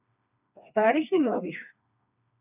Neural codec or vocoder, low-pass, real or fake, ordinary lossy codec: codec, 16 kHz, 4 kbps, FreqCodec, smaller model; 3.6 kHz; fake; none